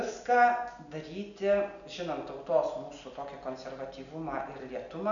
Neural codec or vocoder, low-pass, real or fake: none; 7.2 kHz; real